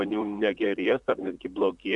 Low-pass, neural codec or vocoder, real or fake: 10.8 kHz; vocoder, 44.1 kHz, 128 mel bands, Pupu-Vocoder; fake